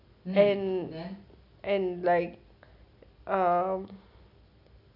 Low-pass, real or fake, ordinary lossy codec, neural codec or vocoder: 5.4 kHz; real; none; none